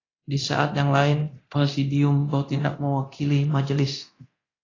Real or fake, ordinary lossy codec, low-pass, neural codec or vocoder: fake; AAC, 32 kbps; 7.2 kHz; codec, 24 kHz, 0.9 kbps, DualCodec